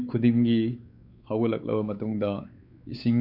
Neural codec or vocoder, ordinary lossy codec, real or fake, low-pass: codec, 16 kHz, 4 kbps, X-Codec, WavLM features, trained on Multilingual LibriSpeech; Opus, 64 kbps; fake; 5.4 kHz